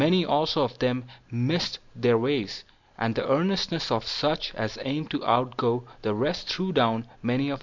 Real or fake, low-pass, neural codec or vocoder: real; 7.2 kHz; none